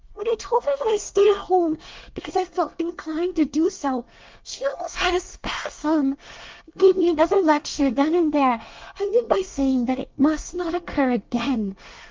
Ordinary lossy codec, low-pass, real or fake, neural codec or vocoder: Opus, 24 kbps; 7.2 kHz; fake; codec, 24 kHz, 1 kbps, SNAC